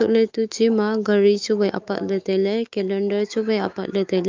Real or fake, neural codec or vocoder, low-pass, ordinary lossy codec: fake; autoencoder, 48 kHz, 128 numbers a frame, DAC-VAE, trained on Japanese speech; 7.2 kHz; Opus, 24 kbps